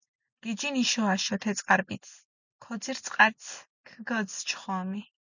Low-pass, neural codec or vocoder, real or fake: 7.2 kHz; none; real